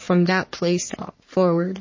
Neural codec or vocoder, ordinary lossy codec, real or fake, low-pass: codec, 44.1 kHz, 3.4 kbps, Pupu-Codec; MP3, 32 kbps; fake; 7.2 kHz